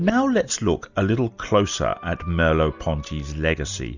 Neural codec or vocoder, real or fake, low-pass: none; real; 7.2 kHz